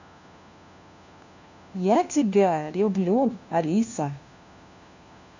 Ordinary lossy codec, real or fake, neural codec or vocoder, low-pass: none; fake; codec, 16 kHz, 1 kbps, FunCodec, trained on LibriTTS, 50 frames a second; 7.2 kHz